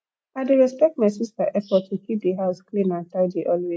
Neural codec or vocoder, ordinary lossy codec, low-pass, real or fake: none; none; none; real